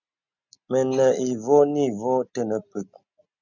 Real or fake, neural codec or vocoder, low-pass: real; none; 7.2 kHz